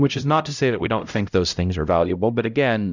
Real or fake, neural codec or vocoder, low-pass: fake; codec, 16 kHz, 0.5 kbps, X-Codec, HuBERT features, trained on LibriSpeech; 7.2 kHz